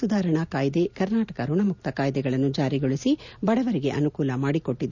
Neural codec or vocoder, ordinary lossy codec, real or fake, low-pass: none; none; real; 7.2 kHz